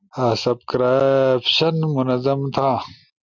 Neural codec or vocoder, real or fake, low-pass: none; real; 7.2 kHz